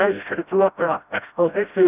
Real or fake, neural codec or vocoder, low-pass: fake; codec, 16 kHz, 0.5 kbps, FreqCodec, smaller model; 3.6 kHz